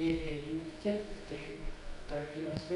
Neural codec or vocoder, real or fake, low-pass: codec, 24 kHz, 0.9 kbps, WavTokenizer, medium music audio release; fake; 10.8 kHz